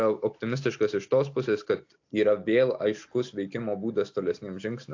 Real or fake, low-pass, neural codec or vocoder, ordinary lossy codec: real; 7.2 kHz; none; AAC, 48 kbps